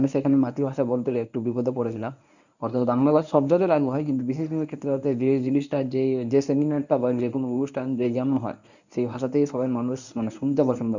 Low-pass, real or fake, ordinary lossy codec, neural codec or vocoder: 7.2 kHz; fake; none; codec, 24 kHz, 0.9 kbps, WavTokenizer, medium speech release version 1